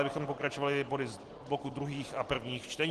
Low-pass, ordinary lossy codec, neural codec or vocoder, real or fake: 10.8 kHz; Opus, 16 kbps; none; real